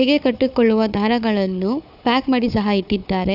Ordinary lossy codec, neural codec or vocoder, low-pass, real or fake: none; codec, 16 kHz, 4 kbps, FunCodec, trained on Chinese and English, 50 frames a second; 5.4 kHz; fake